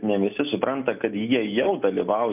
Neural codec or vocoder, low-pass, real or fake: none; 3.6 kHz; real